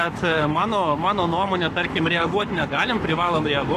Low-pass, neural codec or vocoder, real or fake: 14.4 kHz; codec, 44.1 kHz, 7.8 kbps, Pupu-Codec; fake